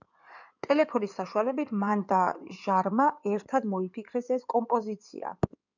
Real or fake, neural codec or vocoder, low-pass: fake; codec, 16 kHz in and 24 kHz out, 2.2 kbps, FireRedTTS-2 codec; 7.2 kHz